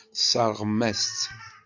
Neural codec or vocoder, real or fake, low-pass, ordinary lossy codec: none; real; 7.2 kHz; Opus, 64 kbps